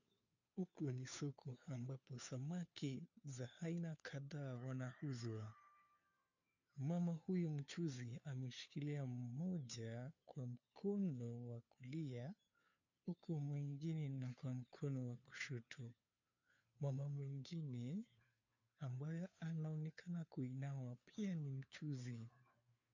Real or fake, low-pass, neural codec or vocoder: fake; 7.2 kHz; codec, 16 kHz, 2 kbps, FunCodec, trained on Chinese and English, 25 frames a second